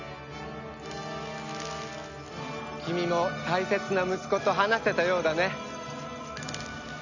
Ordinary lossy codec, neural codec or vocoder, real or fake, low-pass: none; none; real; 7.2 kHz